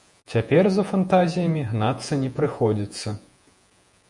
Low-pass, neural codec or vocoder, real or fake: 10.8 kHz; vocoder, 48 kHz, 128 mel bands, Vocos; fake